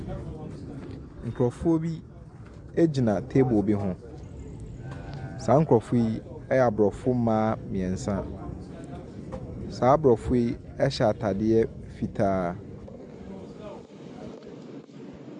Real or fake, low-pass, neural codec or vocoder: real; 10.8 kHz; none